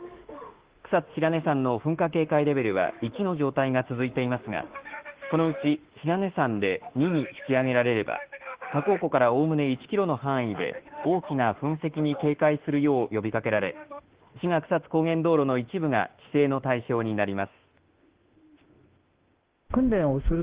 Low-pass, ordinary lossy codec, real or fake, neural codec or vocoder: 3.6 kHz; Opus, 16 kbps; fake; autoencoder, 48 kHz, 32 numbers a frame, DAC-VAE, trained on Japanese speech